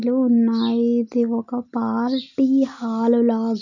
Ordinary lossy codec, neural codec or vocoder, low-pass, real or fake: none; none; 7.2 kHz; real